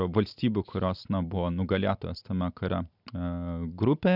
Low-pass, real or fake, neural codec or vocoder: 5.4 kHz; real; none